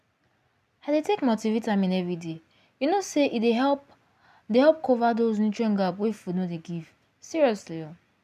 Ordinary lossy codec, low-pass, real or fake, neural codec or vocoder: none; 14.4 kHz; real; none